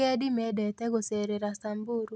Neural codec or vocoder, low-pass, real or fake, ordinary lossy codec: none; none; real; none